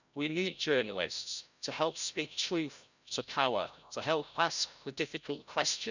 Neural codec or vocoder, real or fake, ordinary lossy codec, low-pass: codec, 16 kHz, 0.5 kbps, FreqCodec, larger model; fake; none; 7.2 kHz